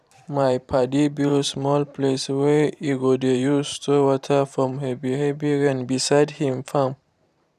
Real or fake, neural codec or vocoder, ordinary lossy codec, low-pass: fake; vocoder, 44.1 kHz, 128 mel bands every 512 samples, BigVGAN v2; none; 14.4 kHz